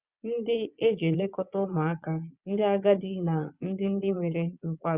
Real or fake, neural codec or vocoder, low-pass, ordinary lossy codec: fake; vocoder, 22.05 kHz, 80 mel bands, Vocos; 3.6 kHz; Opus, 64 kbps